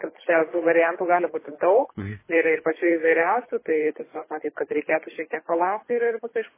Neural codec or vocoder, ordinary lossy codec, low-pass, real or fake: codec, 24 kHz, 3 kbps, HILCodec; MP3, 16 kbps; 3.6 kHz; fake